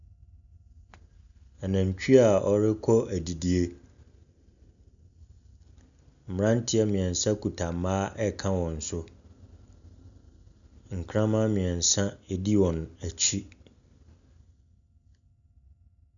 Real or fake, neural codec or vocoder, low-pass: real; none; 7.2 kHz